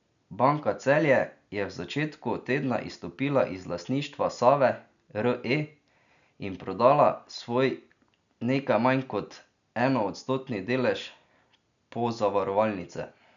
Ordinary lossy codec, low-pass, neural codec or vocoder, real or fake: none; 7.2 kHz; none; real